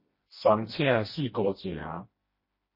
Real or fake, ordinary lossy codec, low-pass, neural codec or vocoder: fake; MP3, 24 kbps; 5.4 kHz; codec, 16 kHz, 1 kbps, FreqCodec, smaller model